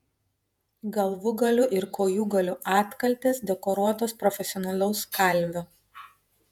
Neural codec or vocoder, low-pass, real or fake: vocoder, 48 kHz, 128 mel bands, Vocos; 19.8 kHz; fake